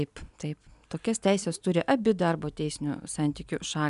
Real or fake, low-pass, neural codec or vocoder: real; 10.8 kHz; none